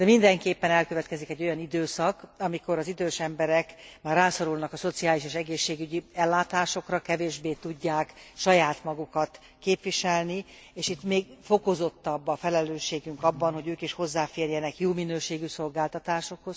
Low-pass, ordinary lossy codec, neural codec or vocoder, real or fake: none; none; none; real